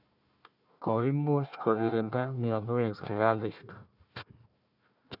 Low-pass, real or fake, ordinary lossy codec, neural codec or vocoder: 5.4 kHz; fake; none; codec, 16 kHz, 1 kbps, FunCodec, trained on Chinese and English, 50 frames a second